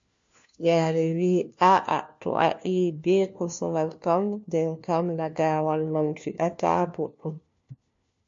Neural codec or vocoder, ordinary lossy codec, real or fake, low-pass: codec, 16 kHz, 1 kbps, FunCodec, trained on LibriTTS, 50 frames a second; MP3, 48 kbps; fake; 7.2 kHz